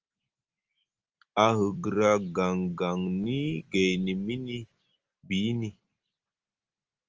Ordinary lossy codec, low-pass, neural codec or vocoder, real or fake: Opus, 24 kbps; 7.2 kHz; none; real